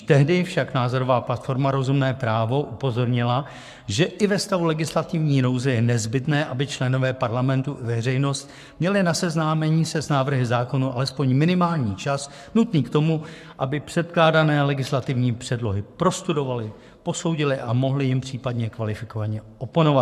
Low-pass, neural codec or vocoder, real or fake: 14.4 kHz; codec, 44.1 kHz, 7.8 kbps, Pupu-Codec; fake